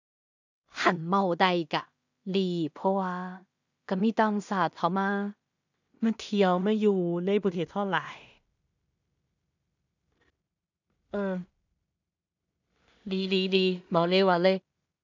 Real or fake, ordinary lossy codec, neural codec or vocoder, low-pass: fake; none; codec, 16 kHz in and 24 kHz out, 0.4 kbps, LongCat-Audio-Codec, two codebook decoder; 7.2 kHz